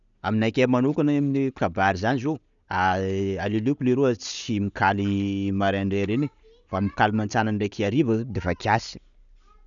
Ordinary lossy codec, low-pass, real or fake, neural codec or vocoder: none; 7.2 kHz; real; none